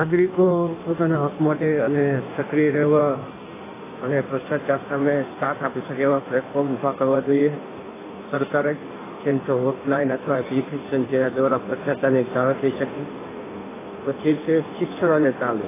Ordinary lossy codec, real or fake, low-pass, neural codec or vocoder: AAC, 16 kbps; fake; 3.6 kHz; codec, 16 kHz in and 24 kHz out, 1.1 kbps, FireRedTTS-2 codec